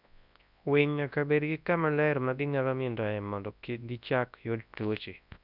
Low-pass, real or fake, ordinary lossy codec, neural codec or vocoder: 5.4 kHz; fake; none; codec, 24 kHz, 0.9 kbps, WavTokenizer, large speech release